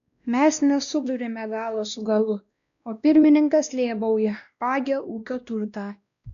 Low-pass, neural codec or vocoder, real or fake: 7.2 kHz; codec, 16 kHz, 1 kbps, X-Codec, WavLM features, trained on Multilingual LibriSpeech; fake